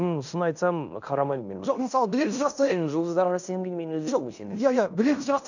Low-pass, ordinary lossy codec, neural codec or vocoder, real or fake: 7.2 kHz; none; codec, 16 kHz in and 24 kHz out, 0.9 kbps, LongCat-Audio-Codec, fine tuned four codebook decoder; fake